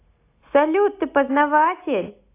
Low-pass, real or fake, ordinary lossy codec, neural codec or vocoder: 3.6 kHz; real; AAC, 24 kbps; none